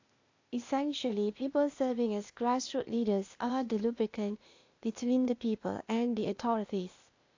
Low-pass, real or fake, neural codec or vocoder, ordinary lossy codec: 7.2 kHz; fake; codec, 16 kHz, 0.8 kbps, ZipCodec; AAC, 48 kbps